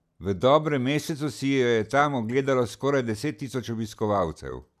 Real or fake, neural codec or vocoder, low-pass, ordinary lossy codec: real; none; 14.4 kHz; none